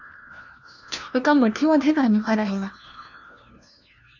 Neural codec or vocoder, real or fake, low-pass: codec, 16 kHz, 1 kbps, FunCodec, trained on LibriTTS, 50 frames a second; fake; 7.2 kHz